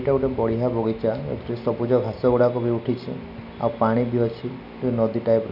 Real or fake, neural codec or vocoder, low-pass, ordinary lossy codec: real; none; 5.4 kHz; none